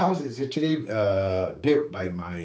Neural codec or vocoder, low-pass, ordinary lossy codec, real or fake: codec, 16 kHz, 4 kbps, X-Codec, HuBERT features, trained on general audio; none; none; fake